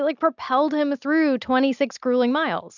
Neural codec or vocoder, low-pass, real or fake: none; 7.2 kHz; real